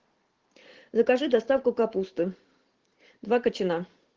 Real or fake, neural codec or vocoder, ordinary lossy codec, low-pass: fake; vocoder, 44.1 kHz, 128 mel bands every 512 samples, BigVGAN v2; Opus, 16 kbps; 7.2 kHz